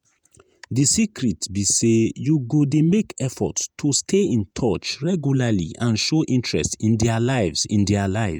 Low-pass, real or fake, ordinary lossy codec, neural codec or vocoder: none; fake; none; vocoder, 48 kHz, 128 mel bands, Vocos